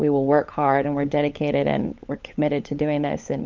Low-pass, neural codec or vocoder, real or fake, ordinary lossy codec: 7.2 kHz; codec, 16 kHz, 16 kbps, FunCodec, trained on LibriTTS, 50 frames a second; fake; Opus, 24 kbps